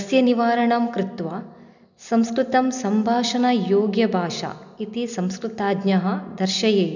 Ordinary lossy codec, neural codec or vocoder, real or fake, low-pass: none; none; real; 7.2 kHz